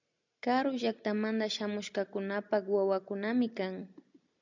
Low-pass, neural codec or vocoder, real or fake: 7.2 kHz; none; real